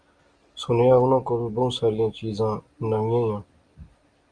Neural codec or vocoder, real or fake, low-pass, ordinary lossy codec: none; real; 9.9 kHz; Opus, 32 kbps